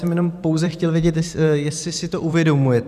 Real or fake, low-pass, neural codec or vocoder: fake; 14.4 kHz; vocoder, 44.1 kHz, 128 mel bands every 512 samples, BigVGAN v2